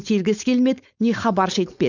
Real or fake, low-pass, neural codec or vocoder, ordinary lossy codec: fake; 7.2 kHz; codec, 16 kHz, 4.8 kbps, FACodec; none